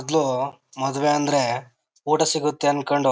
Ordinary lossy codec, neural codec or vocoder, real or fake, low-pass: none; none; real; none